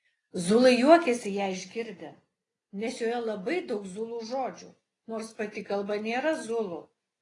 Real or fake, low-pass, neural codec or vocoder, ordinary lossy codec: real; 10.8 kHz; none; AAC, 32 kbps